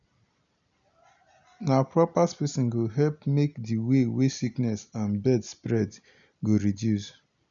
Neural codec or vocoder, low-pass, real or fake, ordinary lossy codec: none; 7.2 kHz; real; none